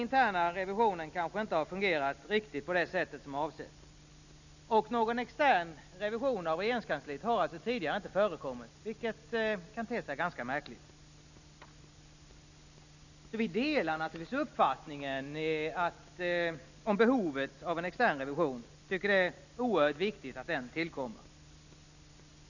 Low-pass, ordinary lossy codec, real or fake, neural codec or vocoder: 7.2 kHz; none; real; none